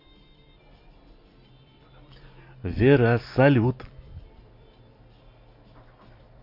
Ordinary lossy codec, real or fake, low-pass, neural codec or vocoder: MP3, 32 kbps; fake; 5.4 kHz; vocoder, 44.1 kHz, 128 mel bands every 256 samples, BigVGAN v2